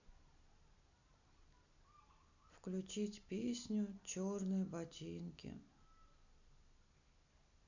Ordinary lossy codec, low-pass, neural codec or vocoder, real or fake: none; 7.2 kHz; none; real